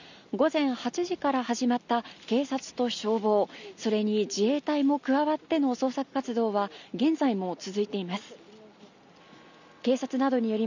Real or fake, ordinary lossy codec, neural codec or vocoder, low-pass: real; none; none; 7.2 kHz